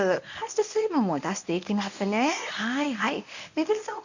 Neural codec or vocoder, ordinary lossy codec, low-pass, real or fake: codec, 24 kHz, 0.9 kbps, WavTokenizer, medium speech release version 1; none; 7.2 kHz; fake